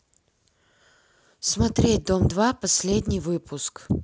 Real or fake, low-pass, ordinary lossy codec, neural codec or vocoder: real; none; none; none